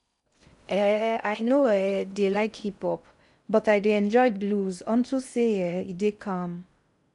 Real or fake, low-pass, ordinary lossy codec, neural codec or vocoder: fake; 10.8 kHz; none; codec, 16 kHz in and 24 kHz out, 0.6 kbps, FocalCodec, streaming, 4096 codes